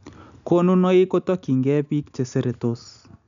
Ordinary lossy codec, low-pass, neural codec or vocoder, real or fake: MP3, 96 kbps; 7.2 kHz; none; real